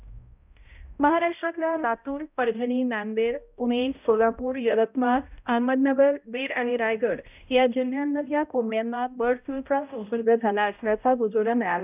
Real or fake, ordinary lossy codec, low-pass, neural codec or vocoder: fake; none; 3.6 kHz; codec, 16 kHz, 0.5 kbps, X-Codec, HuBERT features, trained on balanced general audio